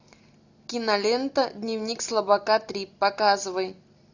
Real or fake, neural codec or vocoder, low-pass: real; none; 7.2 kHz